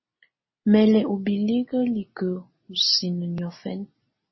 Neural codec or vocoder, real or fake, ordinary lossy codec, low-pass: none; real; MP3, 24 kbps; 7.2 kHz